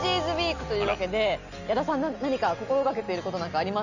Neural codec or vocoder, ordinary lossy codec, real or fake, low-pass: none; none; real; 7.2 kHz